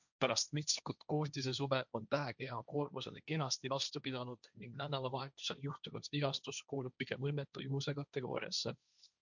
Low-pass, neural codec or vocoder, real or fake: 7.2 kHz; codec, 16 kHz, 1.1 kbps, Voila-Tokenizer; fake